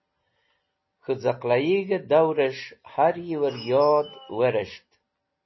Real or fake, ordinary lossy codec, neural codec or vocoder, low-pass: real; MP3, 24 kbps; none; 7.2 kHz